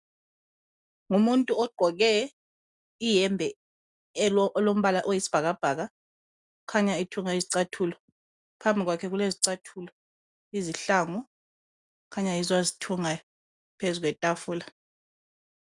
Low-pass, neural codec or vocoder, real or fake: 10.8 kHz; none; real